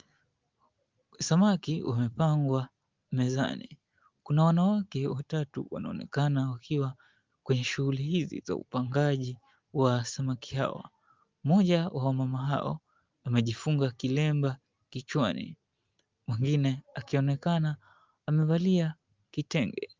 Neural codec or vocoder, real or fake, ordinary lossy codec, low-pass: none; real; Opus, 32 kbps; 7.2 kHz